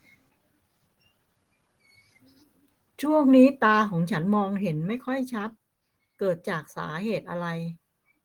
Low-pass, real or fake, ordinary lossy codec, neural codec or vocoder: 19.8 kHz; real; Opus, 16 kbps; none